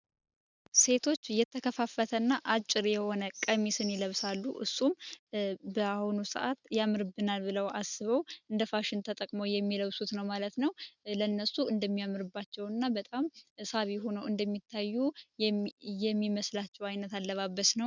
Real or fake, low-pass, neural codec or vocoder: real; 7.2 kHz; none